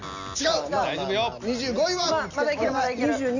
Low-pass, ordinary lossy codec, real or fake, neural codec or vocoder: 7.2 kHz; none; real; none